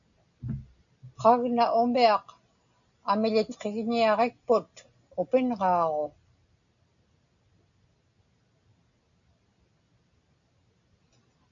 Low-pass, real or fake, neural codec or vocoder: 7.2 kHz; real; none